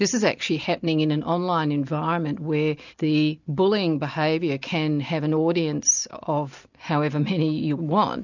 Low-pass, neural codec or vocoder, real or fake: 7.2 kHz; none; real